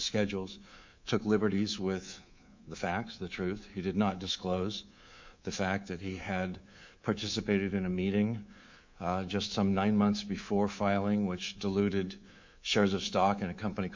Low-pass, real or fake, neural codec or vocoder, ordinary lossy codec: 7.2 kHz; fake; codec, 24 kHz, 3.1 kbps, DualCodec; MP3, 64 kbps